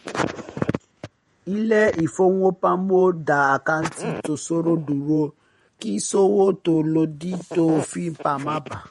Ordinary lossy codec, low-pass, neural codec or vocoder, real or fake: MP3, 48 kbps; 19.8 kHz; vocoder, 48 kHz, 128 mel bands, Vocos; fake